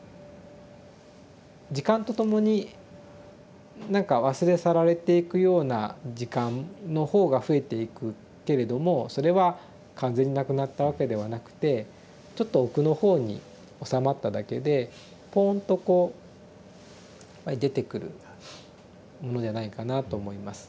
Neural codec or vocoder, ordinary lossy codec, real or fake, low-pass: none; none; real; none